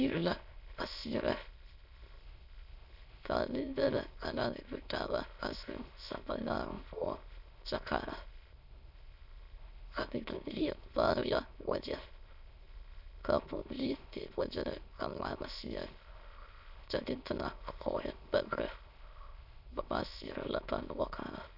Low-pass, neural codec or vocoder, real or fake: 5.4 kHz; autoencoder, 22.05 kHz, a latent of 192 numbers a frame, VITS, trained on many speakers; fake